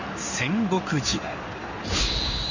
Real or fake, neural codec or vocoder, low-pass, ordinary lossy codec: fake; codec, 16 kHz in and 24 kHz out, 1 kbps, XY-Tokenizer; 7.2 kHz; Opus, 64 kbps